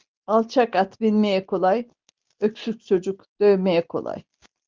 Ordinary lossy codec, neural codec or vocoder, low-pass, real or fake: Opus, 32 kbps; none; 7.2 kHz; real